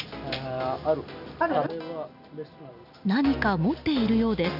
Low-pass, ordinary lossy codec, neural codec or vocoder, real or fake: 5.4 kHz; none; none; real